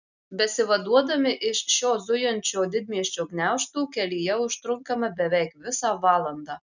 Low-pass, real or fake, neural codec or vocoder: 7.2 kHz; real; none